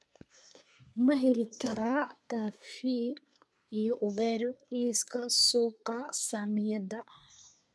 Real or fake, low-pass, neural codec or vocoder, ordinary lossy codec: fake; none; codec, 24 kHz, 1 kbps, SNAC; none